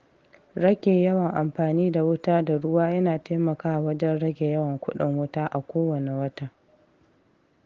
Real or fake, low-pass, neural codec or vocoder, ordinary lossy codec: real; 7.2 kHz; none; Opus, 16 kbps